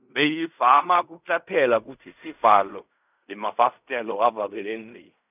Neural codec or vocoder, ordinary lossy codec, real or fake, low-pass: codec, 16 kHz in and 24 kHz out, 0.4 kbps, LongCat-Audio-Codec, fine tuned four codebook decoder; none; fake; 3.6 kHz